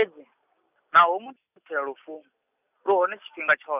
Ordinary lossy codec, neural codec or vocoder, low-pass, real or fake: none; none; 3.6 kHz; real